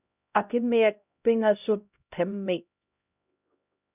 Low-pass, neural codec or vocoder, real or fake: 3.6 kHz; codec, 16 kHz, 0.5 kbps, X-Codec, HuBERT features, trained on LibriSpeech; fake